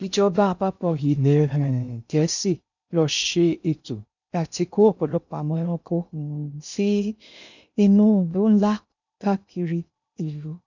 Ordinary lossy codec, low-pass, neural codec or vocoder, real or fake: none; 7.2 kHz; codec, 16 kHz in and 24 kHz out, 0.6 kbps, FocalCodec, streaming, 2048 codes; fake